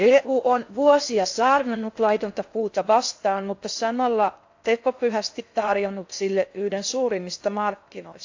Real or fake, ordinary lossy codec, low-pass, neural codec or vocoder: fake; AAC, 48 kbps; 7.2 kHz; codec, 16 kHz in and 24 kHz out, 0.6 kbps, FocalCodec, streaming, 4096 codes